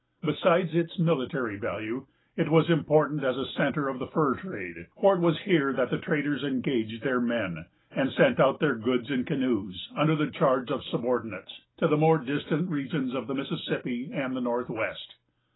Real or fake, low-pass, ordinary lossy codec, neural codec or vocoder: real; 7.2 kHz; AAC, 16 kbps; none